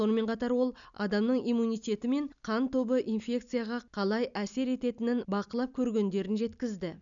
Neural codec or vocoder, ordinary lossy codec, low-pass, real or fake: none; none; 7.2 kHz; real